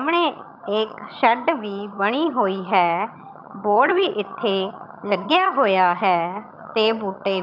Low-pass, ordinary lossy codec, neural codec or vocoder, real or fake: 5.4 kHz; none; vocoder, 22.05 kHz, 80 mel bands, HiFi-GAN; fake